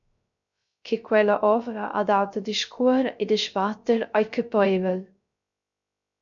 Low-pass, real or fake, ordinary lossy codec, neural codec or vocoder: 7.2 kHz; fake; MP3, 64 kbps; codec, 16 kHz, 0.3 kbps, FocalCodec